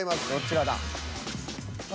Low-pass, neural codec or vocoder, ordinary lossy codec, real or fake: none; none; none; real